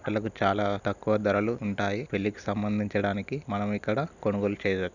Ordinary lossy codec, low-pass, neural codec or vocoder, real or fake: none; 7.2 kHz; none; real